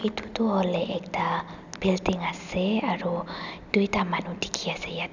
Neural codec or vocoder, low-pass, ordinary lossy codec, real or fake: none; 7.2 kHz; none; real